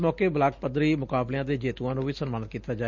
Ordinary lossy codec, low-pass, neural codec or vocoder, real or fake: Opus, 64 kbps; 7.2 kHz; none; real